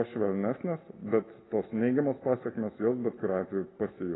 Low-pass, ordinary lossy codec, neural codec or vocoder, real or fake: 7.2 kHz; AAC, 16 kbps; none; real